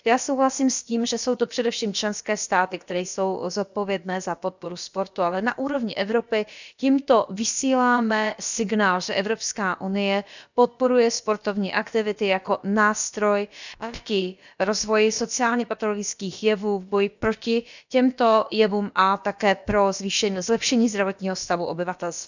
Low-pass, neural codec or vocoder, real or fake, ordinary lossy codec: 7.2 kHz; codec, 16 kHz, about 1 kbps, DyCAST, with the encoder's durations; fake; none